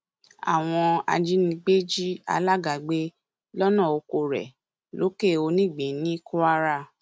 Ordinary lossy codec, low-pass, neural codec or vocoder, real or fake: none; none; none; real